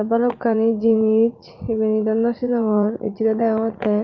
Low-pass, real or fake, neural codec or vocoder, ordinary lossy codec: 7.2 kHz; fake; vocoder, 44.1 kHz, 80 mel bands, Vocos; Opus, 24 kbps